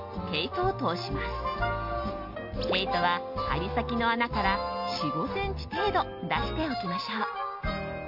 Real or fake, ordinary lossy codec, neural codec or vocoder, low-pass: real; MP3, 48 kbps; none; 5.4 kHz